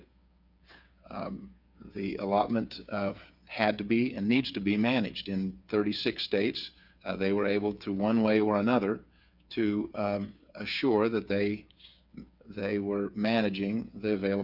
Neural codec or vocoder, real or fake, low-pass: codec, 16 kHz, 8 kbps, FreqCodec, smaller model; fake; 5.4 kHz